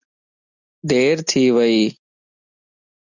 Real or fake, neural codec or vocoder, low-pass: real; none; 7.2 kHz